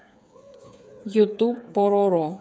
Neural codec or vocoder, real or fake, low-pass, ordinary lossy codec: codec, 16 kHz, 8 kbps, FreqCodec, smaller model; fake; none; none